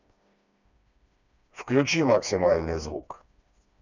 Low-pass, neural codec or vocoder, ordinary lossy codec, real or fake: 7.2 kHz; codec, 16 kHz, 2 kbps, FreqCodec, smaller model; none; fake